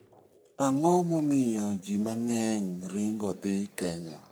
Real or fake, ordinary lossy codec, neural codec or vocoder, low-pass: fake; none; codec, 44.1 kHz, 3.4 kbps, Pupu-Codec; none